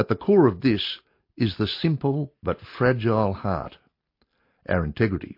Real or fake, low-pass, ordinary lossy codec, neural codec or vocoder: real; 5.4 kHz; MP3, 32 kbps; none